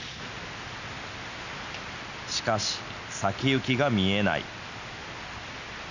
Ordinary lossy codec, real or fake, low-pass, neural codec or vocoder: none; real; 7.2 kHz; none